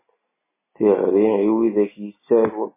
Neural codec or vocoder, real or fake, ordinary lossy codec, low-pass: none; real; MP3, 16 kbps; 3.6 kHz